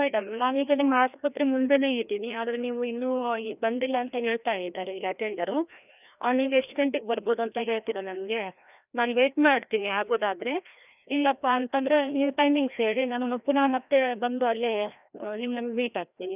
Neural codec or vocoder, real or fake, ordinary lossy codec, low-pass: codec, 16 kHz, 1 kbps, FreqCodec, larger model; fake; none; 3.6 kHz